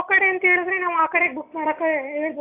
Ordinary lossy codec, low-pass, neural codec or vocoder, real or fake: AAC, 24 kbps; 3.6 kHz; none; real